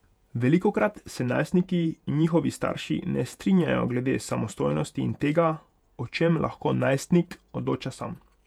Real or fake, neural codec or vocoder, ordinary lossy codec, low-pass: fake; vocoder, 48 kHz, 128 mel bands, Vocos; none; 19.8 kHz